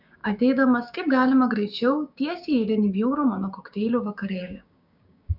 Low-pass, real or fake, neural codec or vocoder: 5.4 kHz; fake; codec, 16 kHz, 6 kbps, DAC